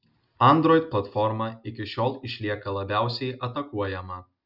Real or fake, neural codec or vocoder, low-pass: real; none; 5.4 kHz